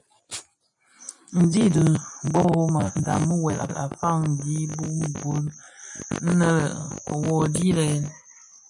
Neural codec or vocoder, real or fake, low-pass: none; real; 10.8 kHz